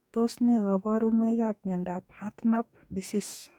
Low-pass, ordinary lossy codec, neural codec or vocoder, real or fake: 19.8 kHz; none; codec, 44.1 kHz, 2.6 kbps, DAC; fake